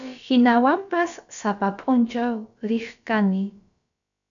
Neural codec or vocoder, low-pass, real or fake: codec, 16 kHz, about 1 kbps, DyCAST, with the encoder's durations; 7.2 kHz; fake